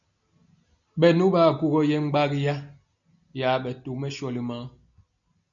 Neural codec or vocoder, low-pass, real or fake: none; 7.2 kHz; real